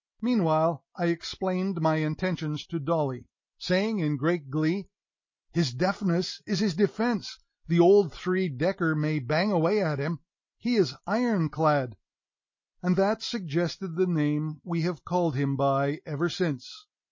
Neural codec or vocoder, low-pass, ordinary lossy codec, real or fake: none; 7.2 kHz; MP3, 32 kbps; real